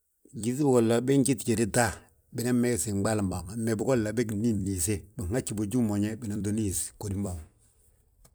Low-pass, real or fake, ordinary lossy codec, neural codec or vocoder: none; fake; none; vocoder, 44.1 kHz, 128 mel bands every 256 samples, BigVGAN v2